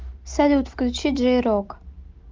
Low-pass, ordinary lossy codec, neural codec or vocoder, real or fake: 7.2 kHz; Opus, 32 kbps; none; real